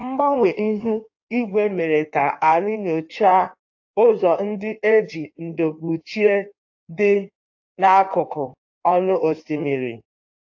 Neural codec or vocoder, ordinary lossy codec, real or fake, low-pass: codec, 16 kHz in and 24 kHz out, 1.1 kbps, FireRedTTS-2 codec; AAC, 48 kbps; fake; 7.2 kHz